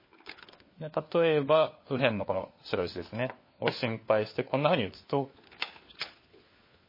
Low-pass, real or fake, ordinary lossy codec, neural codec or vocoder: 5.4 kHz; fake; MP3, 24 kbps; codec, 16 kHz, 4 kbps, FunCodec, trained on LibriTTS, 50 frames a second